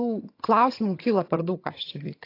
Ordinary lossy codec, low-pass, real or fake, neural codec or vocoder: AAC, 32 kbps; 5.4 kHz; fake; vocoder, 22.05 kHz, 80 mel bands, HiFi-GAN